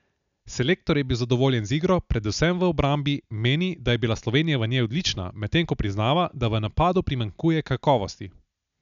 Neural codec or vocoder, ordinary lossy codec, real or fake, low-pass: none; none; real; 7.2 kHz